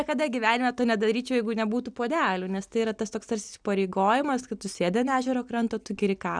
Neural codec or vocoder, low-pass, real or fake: none; 9.9 kHz; real